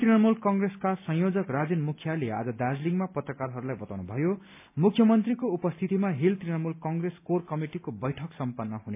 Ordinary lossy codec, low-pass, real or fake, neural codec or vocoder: MP3, 32 kbps; 3.6 kHz; real; none